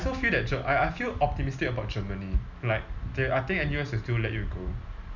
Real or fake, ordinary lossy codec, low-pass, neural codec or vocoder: real; none; 7.2 kHz; none